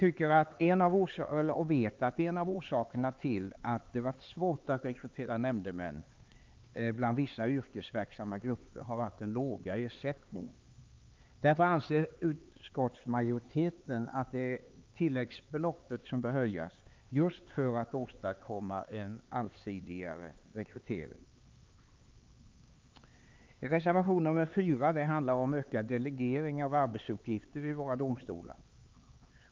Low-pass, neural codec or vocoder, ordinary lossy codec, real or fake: 7.2 kHz; codec, 16 kHz, 4 kbps, X-Codec, HuBERT features, trained on LibriSpeech; Opus, 32 kbps; fake